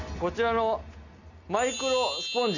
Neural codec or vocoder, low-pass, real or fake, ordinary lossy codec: none; 7.2 kHz; real; none